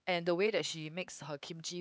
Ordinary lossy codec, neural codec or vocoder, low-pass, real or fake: none; codec, 16 kHz, 4 kbps, X-Codec, HuBERT features, trained on LibriSpeech; none; fake